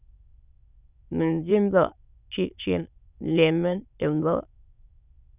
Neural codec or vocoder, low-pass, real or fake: autoencoder, 22.05 kHz, a latent of 192 numbers a frame, VITS, trained on many speakers; 3.6 kHz; fake